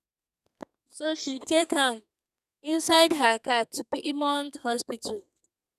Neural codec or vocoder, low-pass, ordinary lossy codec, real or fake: codec, 32 kHz, 1.9 kbps, SNAC; 14.4 kHz; none; fake